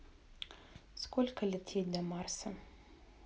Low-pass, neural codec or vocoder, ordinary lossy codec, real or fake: none; none; none; real